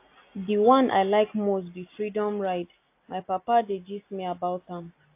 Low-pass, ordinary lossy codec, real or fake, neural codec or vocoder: 3.6 kHz; AAC, 24 kbps; real; none